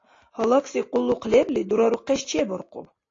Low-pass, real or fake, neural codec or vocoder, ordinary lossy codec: 7.2 kHz; real; none; AAC, 32 kbps